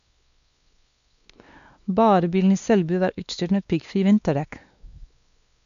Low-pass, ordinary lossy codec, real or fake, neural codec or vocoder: 7.2 kHz; none; fake; codec, 16 kHz, 2 kbps, X-Codec, WavLM features, trained on Multilingual LibriSpeech